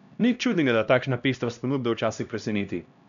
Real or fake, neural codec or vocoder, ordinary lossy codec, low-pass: fake; codec, 16 kHz, 1 kbps, X-Codec, HuBERT features, trained on LibriSpeech; none; 7.2 kHz